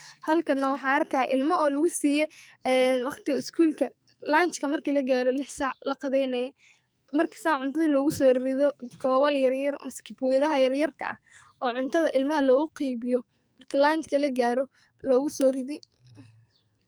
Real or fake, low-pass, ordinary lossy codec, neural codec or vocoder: fake; none; none; codec, 44.1 kHz, 2.6 kbps, SNAC